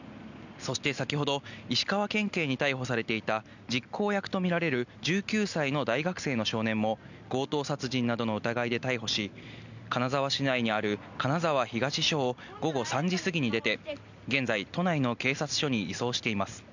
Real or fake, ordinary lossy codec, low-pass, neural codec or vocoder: real; none; 7.2 kHz; none